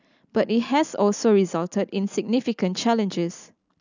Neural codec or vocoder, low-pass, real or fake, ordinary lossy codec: none; 7.2 kHz; real; none